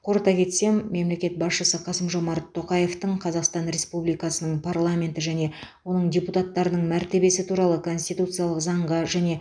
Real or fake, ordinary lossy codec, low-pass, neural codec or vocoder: real; none; 9.9 kHz; none